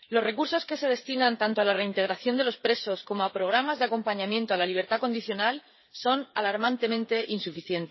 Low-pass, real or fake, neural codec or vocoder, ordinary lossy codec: 7.2 kHz; fake; codec, 16 kHz, 8 kbps, FreqCodec, smaller model; MP3, 24 kbps